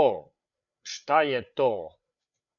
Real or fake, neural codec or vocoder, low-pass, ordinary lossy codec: fake; codec, 16 kHz, 8 kbps, FreqCodec, larger model; 7.2 kHz; MP3, 96 kbps